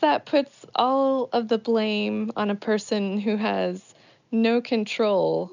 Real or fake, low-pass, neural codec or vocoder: real; 7.2 kHz; none